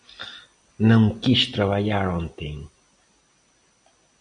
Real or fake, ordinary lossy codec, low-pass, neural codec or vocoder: real; Opus, 64 kbps; 9.9 kHz; none